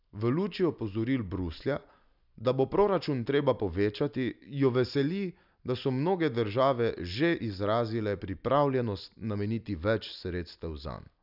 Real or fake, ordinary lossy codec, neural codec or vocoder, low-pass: real; none; none; 5.4 kHz